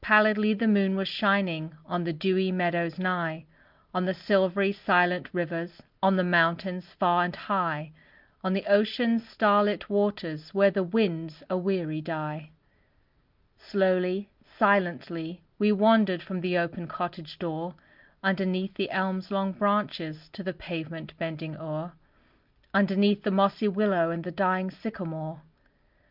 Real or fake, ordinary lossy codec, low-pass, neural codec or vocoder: real; Opus, 24 kbps; 5.4 kHz; none